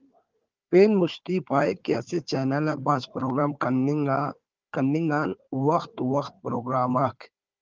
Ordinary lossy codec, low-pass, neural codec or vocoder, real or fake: Opus, 32 kbps; 7.2 kHz; codec, 16 kHz, 4 kbps, FunCodec, trained on Chinese and English, 50 frames a second; fake